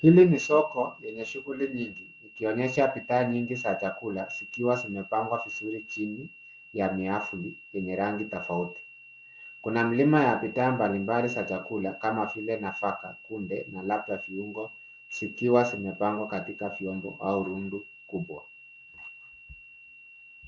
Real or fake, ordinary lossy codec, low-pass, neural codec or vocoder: real; Opus, 32 kbps; 7.2 kHz; none